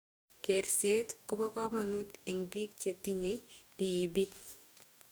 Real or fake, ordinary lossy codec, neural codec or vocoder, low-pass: fake; none; codec, 44.1 kHz, 2.6 kbps, DAC; none